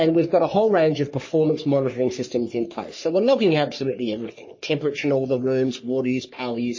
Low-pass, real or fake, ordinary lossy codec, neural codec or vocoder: 7.2 kHz; fake; MP3, 32 kbps; codec, 44.1 kHz, 3.4 kbps, Pupu-Codec